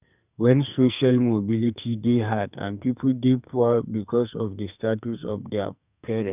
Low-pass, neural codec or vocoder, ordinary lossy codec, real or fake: 3.6 kHz; codec, 44.1 kHz, 2.6 kbps, SNAC; none; fake